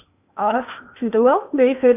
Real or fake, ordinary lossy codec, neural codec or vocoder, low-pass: fake; none; codec, 16 kHz in and 24 kHz out, 0.8 kbps, FocalCodec, streaming, 65536 codes; 3.6 kHz